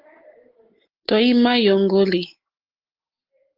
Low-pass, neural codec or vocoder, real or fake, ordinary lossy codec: 5.4 kHz; none; real; Opus, 16 kbps